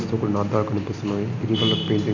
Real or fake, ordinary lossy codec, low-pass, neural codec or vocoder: real; none; 7.2 kHz; none